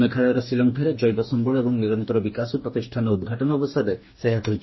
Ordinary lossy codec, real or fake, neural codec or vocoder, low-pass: MP3, 24 kbps; fake; codec, 44.1 kHz, 2.6 kbps, DAC; 7.2 kHz